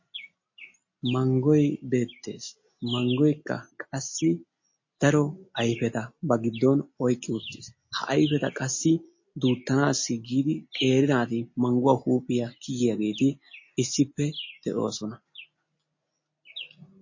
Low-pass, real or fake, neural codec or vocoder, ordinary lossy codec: 7.2 kHz; real; none; MP3, 32 kbps